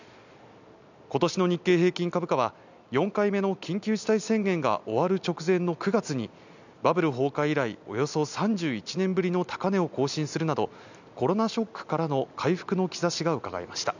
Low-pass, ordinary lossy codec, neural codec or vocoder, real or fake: 7.2 kHz; none; none; real